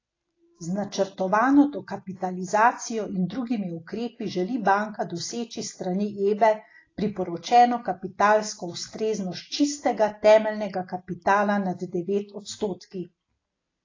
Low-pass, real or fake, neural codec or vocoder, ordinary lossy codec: 7.2 kHz; real; none; AAC, 32 kbps